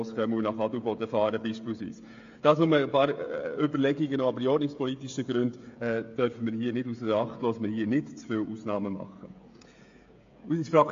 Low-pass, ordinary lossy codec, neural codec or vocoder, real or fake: 7.2 kHz; AAC, 48 kbps; codec, 16 kHz, 16 kbps, FreqCodec, smaller model; fake